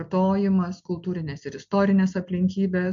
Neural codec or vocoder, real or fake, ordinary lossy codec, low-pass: none; real; MP3, 64 kbps; 7.2 kHz